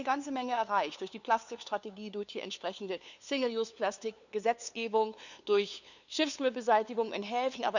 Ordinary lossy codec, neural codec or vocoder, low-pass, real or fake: none; codec, 16 kHz, 2 kbps, FunCodec, trained on LibriTTS, 25 frames a second; 7.2 kHz; fake